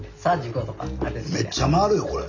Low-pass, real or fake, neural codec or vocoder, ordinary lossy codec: 7.2 kHz; real; none; none